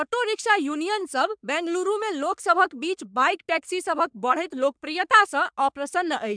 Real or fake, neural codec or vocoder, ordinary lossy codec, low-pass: fake; codec, 44.1 kHz, 3.4 kbps, Pupu-Codec; MP3, 96 kbps; 9.9 kHz